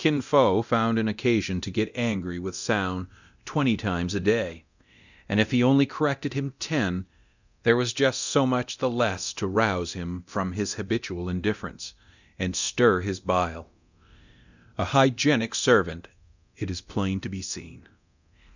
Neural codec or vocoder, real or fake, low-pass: codec, 24 kHz, 0.9 kbps, DualCodec; fake; 7.2 kHz